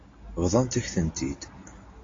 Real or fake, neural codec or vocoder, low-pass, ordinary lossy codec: real; none; 7.2 kHz; AAC, 32 kbps